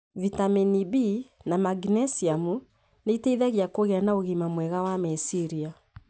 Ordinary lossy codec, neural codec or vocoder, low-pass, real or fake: none; none; none; real